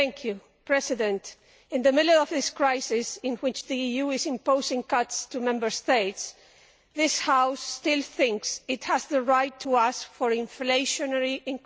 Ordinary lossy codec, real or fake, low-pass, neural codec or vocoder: none; real; none; none